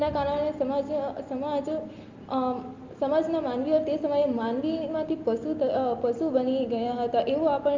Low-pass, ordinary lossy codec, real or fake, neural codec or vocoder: 7.2 kHz; Opus, 24 kbps; real; none